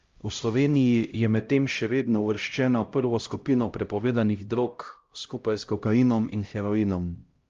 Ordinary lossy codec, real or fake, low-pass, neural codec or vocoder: Opus, 32 kbps; fake; 7.2 kHz; codec, 16 kHz, 0.5 kbps, X-Codec, HuBERT features, trained on LibriSpeech